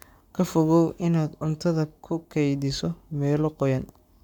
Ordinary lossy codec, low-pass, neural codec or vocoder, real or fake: none; 19.8 kHz; codec, 44.1 kHz, 7.8 kbps, Pupu-Codec; fake